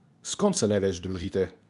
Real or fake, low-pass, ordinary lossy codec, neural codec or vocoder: fake; 10.8 kHz; none; codec, 24 kHz, 0.9 kbps, WavTokenizer, medium speech release version 2